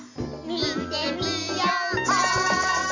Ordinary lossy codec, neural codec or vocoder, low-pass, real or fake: none; none; 7.2 kHz; real